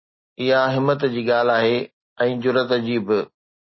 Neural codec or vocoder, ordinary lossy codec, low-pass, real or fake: none; MP3, 24 kbps; 7.2 kHz; real